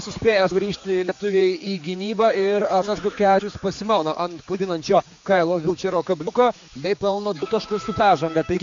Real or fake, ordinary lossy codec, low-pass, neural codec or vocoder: fake; AAC, 48 kbps; 7.2 kHz; codec, 16 kHz, 4 kbps, X-Codec, HuBERT features, trained on general audio